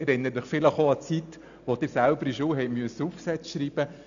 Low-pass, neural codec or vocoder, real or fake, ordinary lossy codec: 7.2 kHz; none; real; none